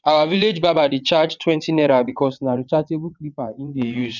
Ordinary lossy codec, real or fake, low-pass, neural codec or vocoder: none; fake; 7.2 kHz; vocoder, 22.05 kHz, 80 mel bands, WaveNeXt